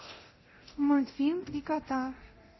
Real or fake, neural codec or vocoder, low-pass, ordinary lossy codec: fake; codec, 24 kHz, 0.9 kbps, DualCodec; 7.2 kHz; MP3, 24 kbps